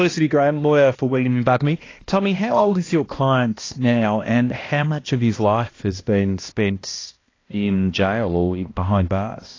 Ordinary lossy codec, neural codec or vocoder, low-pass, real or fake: AAC, 32 kbps; codec, 16 kHz, 1 kbps, X-Codec, HuBERT features, trained on balanced general audio; 7.2 kHz; fake